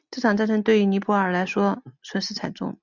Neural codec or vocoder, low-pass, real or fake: none; 7.2 kHz; real